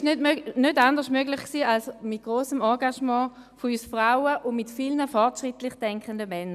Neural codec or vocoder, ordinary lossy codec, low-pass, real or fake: none; none; 14.4 kHz; real